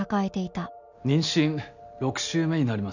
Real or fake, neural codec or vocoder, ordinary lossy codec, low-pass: real; none; none; 7.2 kHz